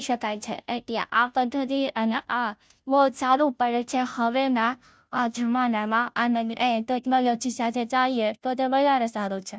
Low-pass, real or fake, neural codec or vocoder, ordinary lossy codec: none; fake; codec, 16 kHz, 0.5 kbps, FunCodec, trained on Chinese and English, 25 frames a second; none